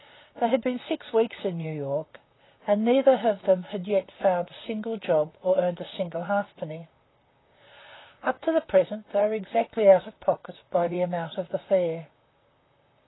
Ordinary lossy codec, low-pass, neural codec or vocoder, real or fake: AAC, 16 kbps; 7.2 kHz; vocoder, 44.1 kHz, 128 mel bands, Pupu-Vocoder; fake